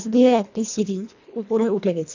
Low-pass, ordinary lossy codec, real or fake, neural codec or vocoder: 7.2 kHz; none; fake; codec, 24 kHz, 1.5 kbps, HILCodec